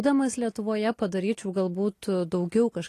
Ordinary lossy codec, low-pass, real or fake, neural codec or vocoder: AAC, 48 kbps; 14.4 kHz; real; none